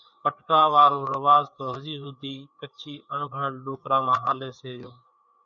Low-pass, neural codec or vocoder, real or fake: 7.2 kHz; codec, 16 kHz, 4 kbps, FreqCodec, larger model; fake